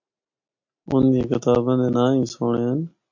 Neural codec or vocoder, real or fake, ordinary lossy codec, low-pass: none; real; MP3, 48 kbps; 7.2 kHz